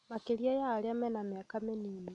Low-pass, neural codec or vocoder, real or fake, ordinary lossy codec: 10.8 kHz; none; real; none